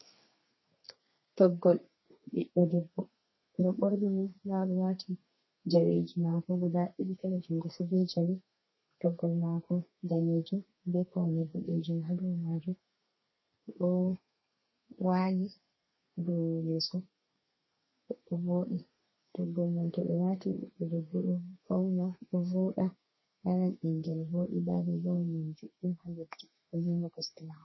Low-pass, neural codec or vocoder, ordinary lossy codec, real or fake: 7.2 kHz; codec, 32 kHz, 1.9 kbps, SNAC; MP3, 24 kbps; fake